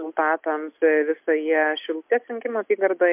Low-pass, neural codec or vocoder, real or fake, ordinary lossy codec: 3.6 kHz; none; real; MP3, 32 kbps